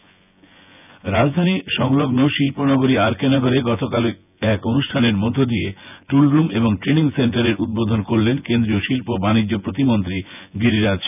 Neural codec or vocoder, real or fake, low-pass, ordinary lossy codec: vocoder, 24 kHz, 100 mel bands, Vocos; fake; 3.6 kHz; none